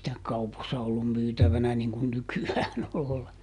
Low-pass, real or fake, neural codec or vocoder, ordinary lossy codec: 10.8 kHz; real; none; none